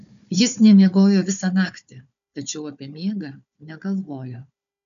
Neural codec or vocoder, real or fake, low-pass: codec, 16 kHz, 4 kbps, FunCodec, trained on Chinese and English, 50 frames a second; fake; 7.2 kHz